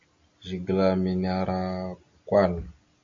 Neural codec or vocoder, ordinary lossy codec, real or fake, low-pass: none; MP3, 48 kbps; real; 7.2 kHz